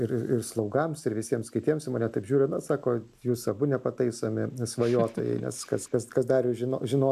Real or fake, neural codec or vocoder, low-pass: real; none; 14.4 kHz